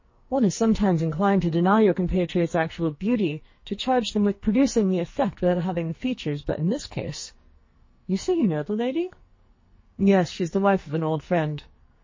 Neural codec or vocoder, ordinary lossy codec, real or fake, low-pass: codec, 44.1 kHz, 2.6 kbps, SNAC; MP3, 32 kbps; fake; 7.2 kHz